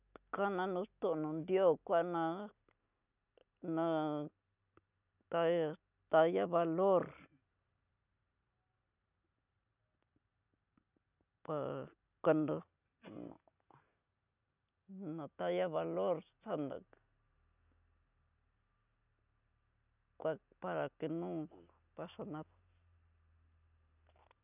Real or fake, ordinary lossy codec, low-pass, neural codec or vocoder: real; none; 3.6 kHz; none